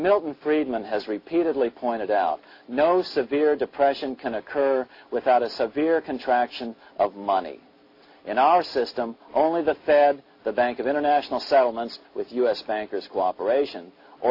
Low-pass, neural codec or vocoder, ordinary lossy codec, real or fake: 5.4 kHz; none; AAC, 32 kbps; real